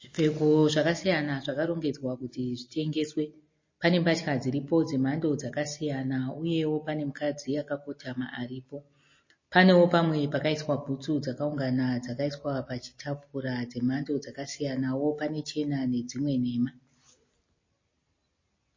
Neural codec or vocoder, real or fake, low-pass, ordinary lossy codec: none; real; 7.2 kHz; MP3, 32 kbps